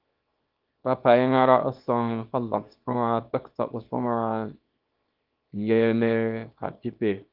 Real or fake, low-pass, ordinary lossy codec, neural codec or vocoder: fake; 5.4 kHz; Opus, 32 kbps; codec, 24 kHz, 0.9 kbps, WavTokenizer, small release